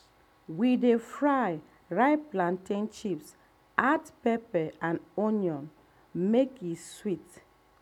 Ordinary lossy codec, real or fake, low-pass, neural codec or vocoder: none; real; 19.8 kHz; none